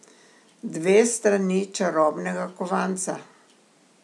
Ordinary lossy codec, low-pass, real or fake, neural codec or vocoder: none; none; real; none